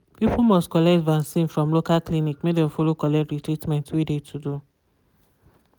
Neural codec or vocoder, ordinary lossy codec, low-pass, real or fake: none; none; none; real